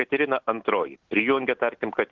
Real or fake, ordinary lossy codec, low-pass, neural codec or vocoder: real; Opus, 16 kbps; 7.2 kHz; none